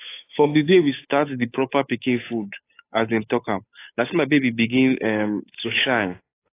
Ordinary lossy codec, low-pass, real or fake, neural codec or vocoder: AAC, 24 kbps; 3.6 kHz; fake; codec, 16 kHz, 8 kbps, FunCodec, trained on Chinese and English, 25 frames a second